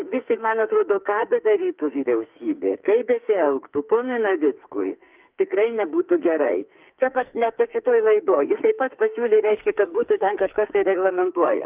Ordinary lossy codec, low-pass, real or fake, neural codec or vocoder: Opus, 24 kbps; 3.6 kHz; fake; codec, 44.1 kHz, 2.6 kbps, SNAC